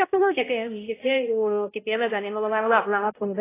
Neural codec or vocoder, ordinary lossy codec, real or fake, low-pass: codec, 16 kHz, 0.5 kbps, X-Codec, HuBERT features, trained on balanced general audio; AAC, 16 kbps; fake; 3.6 kHz